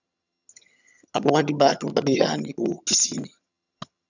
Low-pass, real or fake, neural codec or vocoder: 7.2 kHz; fake; vocoder, 22.05 kHz, 80 mel bands, HiFi-GAN